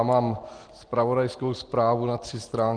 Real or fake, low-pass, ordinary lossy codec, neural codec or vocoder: real; 9.9 kHz; Opus, 16 kbps; none